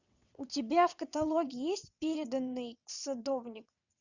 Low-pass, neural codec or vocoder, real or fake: 7.2 kHz; vocoder, 22.05 kHz, 80 mel bands, WaveNeXt; fake